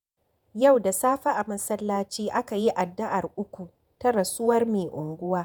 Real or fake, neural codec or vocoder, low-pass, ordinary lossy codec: fake; vocoder, 48 kHz, 128 mel bands, Vocos; none; none